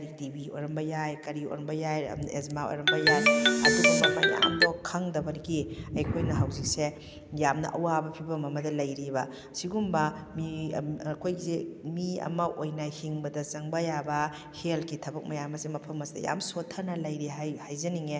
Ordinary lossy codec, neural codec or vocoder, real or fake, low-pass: none; none; real; none